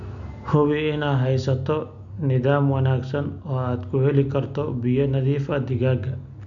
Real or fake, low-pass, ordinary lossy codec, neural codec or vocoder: real; 7.2 kHz; none; none